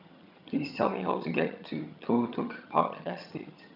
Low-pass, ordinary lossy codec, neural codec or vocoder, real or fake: 5.4 kHz; none; vocoder, 22.05 kHz, 80 mel bands, HiFi-GAN; fake